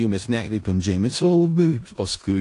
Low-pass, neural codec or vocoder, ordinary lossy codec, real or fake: 10.8 kHz; codec, 16 kHz in and 24 kHz out, 0.4 kbps, LongCat-Audio-Codec, four codebook decoder; AAC, 48 kbps; fake